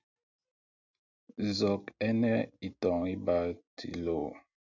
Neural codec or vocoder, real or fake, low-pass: none; real; 7.2 kHz